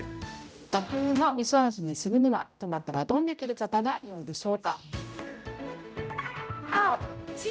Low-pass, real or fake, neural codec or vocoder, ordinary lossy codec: none; fake; codec, 16 kHz, 0.5 kbps, X-Codec, HuBERT features, trained on general audio; none